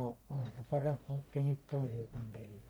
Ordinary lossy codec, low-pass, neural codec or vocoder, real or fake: none; none; codec, 44.1 kHz, 1.7 kbps, Pupu-Codec; fake